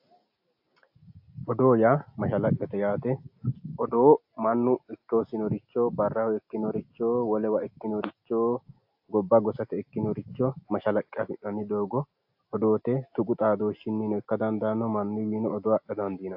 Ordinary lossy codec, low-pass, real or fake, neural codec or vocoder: AAC, 48 kbps; 5.4 kHz; real; none